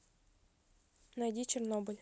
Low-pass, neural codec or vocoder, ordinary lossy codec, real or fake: none; none; none; real